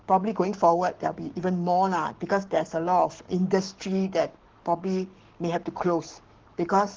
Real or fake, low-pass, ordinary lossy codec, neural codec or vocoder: fake; 7.2 kHz; Opus, 16 kbps; codec, 44.1 kHz, 7.8 kbps, Pupu-Codec